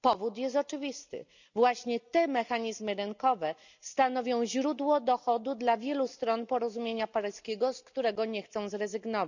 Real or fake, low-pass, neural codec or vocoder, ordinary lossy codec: real; 7.2 kHz; none; none